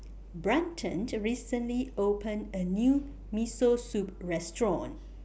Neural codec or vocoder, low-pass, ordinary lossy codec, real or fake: none; none; none; real